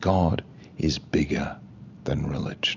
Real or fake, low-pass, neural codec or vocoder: real; 7.2 kHz; none